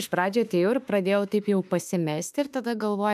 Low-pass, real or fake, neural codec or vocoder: 14.4 kHz; fake; autoencoder, 48 kHz, 32 numbers a frame, DAC-VAE, trained on Japanese speech